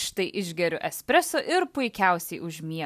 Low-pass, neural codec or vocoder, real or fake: 14.4 kHz; none; real